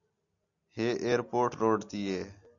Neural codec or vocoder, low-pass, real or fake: none; 7.2 kHz; real